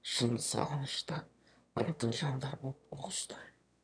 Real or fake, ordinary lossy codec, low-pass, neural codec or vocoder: fake; none; 9.9 kHz; autoencoder, 22.05 kHz, a latent of 192 numbers a frame, VITS, trained on one speaker